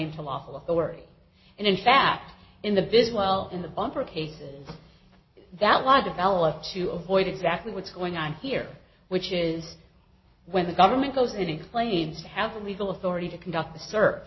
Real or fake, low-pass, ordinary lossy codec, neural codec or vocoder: real; 7.2 kHz; MP3, 24 kbps; none